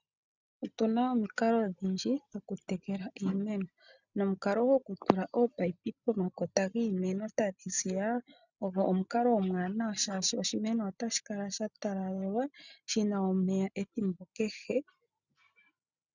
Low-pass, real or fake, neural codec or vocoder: 7.2 kHz; real; none